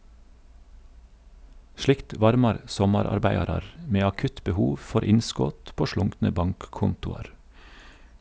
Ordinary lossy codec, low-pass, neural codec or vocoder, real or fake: none; none; none; real